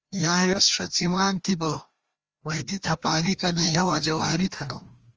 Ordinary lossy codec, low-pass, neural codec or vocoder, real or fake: Opus, 24 kbps; 7.2 kHz; codec, 16 kHz, 1 kbps, FreqCodec, larger model; fake